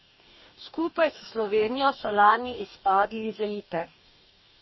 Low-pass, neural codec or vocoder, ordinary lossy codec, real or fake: 7.2 kHz; codec, 44.1 kHz, 2.6 kbps, DAC; MP3, 24 kbps; fake